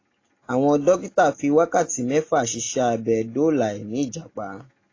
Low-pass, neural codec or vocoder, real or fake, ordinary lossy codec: 7.2 kHz; none; real; AAC, 32 kbps